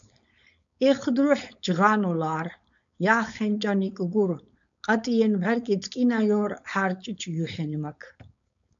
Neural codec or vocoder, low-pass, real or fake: codec, 16 kHz, 4.8 kbps, FACodec; 7.2 kHz; fake